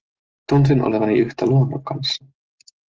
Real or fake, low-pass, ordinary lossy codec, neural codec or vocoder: real; 7.2 kHz; Opus, 16 kbps; none